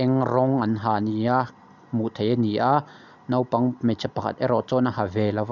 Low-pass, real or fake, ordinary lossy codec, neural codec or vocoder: 7.2 kHz; real; none; none